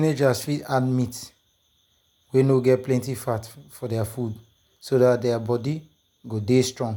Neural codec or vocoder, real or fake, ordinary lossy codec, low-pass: none; real; none; 19.8 kHz